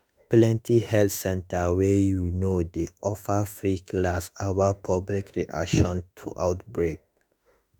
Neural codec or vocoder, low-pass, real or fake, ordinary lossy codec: autoencoder, 48 kHz, 32 numbers a frame, DAC-VAE, trained on Japanese speech; none; fake; none